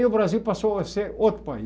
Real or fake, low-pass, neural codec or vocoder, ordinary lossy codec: real; none; none; none